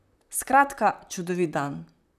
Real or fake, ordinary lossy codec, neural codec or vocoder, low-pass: fake; none; vocoder, 44.1 kHz, 128 mel bands, Pupu-Vocoder; 14.4 kHz